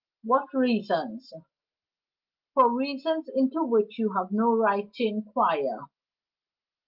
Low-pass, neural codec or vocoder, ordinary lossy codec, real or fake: 5.4 kHz; none; Opus, 24 kbps; real